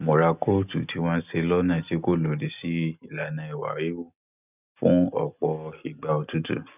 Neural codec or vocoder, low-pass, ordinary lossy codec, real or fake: none; 3.6 kHz; none; real